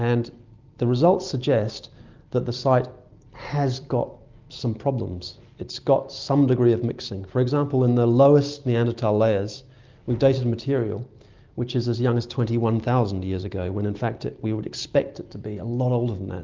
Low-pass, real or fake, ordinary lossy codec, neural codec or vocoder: 7.2 kHz; real; Opus, 24 kbps; none